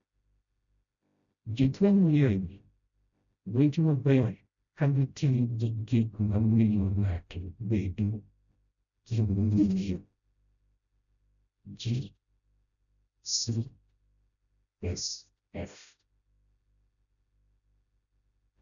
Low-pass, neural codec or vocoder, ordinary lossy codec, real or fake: 7.2 kHz; codec, 16 kHz, 0.5 kbps, FreqCodec, smaller model; none; fake